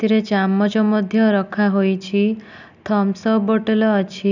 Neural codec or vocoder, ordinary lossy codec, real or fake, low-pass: none; none; real; 7.2 kHz